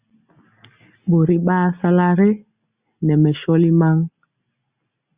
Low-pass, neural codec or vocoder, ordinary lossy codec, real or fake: 3.6 kHz; none; Opus, 64 kbps; real